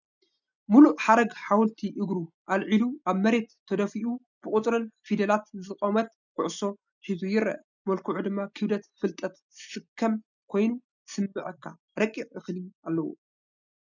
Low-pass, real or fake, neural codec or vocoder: 7.2 kHz; real; none